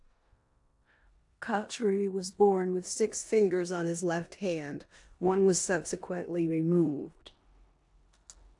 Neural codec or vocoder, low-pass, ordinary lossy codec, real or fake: codec, 16 kHz in and 24 kHz out, 0.9 kbps, LongCat-Audio-Codec, four codebook decoder; 10.8 kHz; AAC, 64 kbps; fake